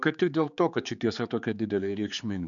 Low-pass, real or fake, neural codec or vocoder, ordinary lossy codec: 7.2 kHz; fake; codec, 16 kHz, 4 kbps, X-Codec, HuBERT features, trained on general audio; MP3, 96 kbps